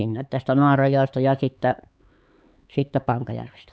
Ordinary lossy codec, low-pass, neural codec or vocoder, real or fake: none; none; codec, 16 kHz, 4 kbps, X-Codec, HuBERT features, trained on LibriSpeech; fake